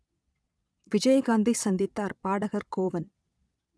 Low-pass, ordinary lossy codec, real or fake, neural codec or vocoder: none; none; fake; vocoder, 22.05 kHz, 80 mel bands, Vocos